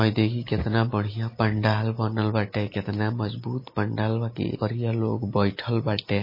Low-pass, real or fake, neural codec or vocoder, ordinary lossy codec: 5.4 kHz; real; none; MP3, 24 kbps